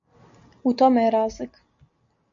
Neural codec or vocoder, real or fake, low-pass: none; real; 7.2 kHz